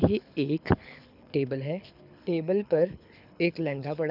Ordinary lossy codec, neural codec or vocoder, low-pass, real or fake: none; codec, 24 kHz, 6 kbps, HILCodec; 5.4 kHz; fake